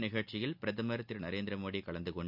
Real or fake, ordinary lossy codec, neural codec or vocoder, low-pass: real; none; none; 5.4 kHz